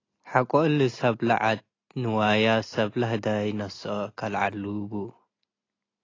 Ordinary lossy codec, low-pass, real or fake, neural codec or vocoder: AAC, 32 kbps; 7.2 kHz; real; none